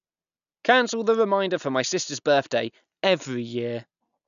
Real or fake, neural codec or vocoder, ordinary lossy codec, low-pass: real; none; none; 7.2 kHz